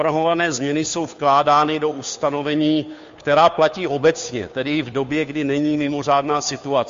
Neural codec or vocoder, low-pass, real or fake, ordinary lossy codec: codec, 16 kHz, 2 kbps, FunCodec, trained on Chinese and English, 25 frames a second; 7.2 kHz; fake; MP3, 48 kbps